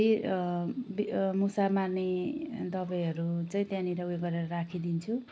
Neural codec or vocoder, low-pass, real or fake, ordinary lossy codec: none; none; real; none